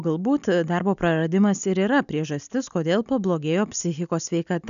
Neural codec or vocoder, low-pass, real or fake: none; 7.2 kHz; real